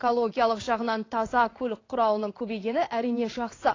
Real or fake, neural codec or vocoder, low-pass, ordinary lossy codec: fake; codec, 16 kHz in and 24 kHz out, 1 kbps, XY-Tokenizer; 7.2 kHz; AAC, 32 kbps